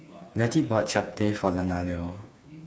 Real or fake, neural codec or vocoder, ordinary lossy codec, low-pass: fake; codec, 16 kHz, 4 kbps, FreqCodec, smaller model; none; none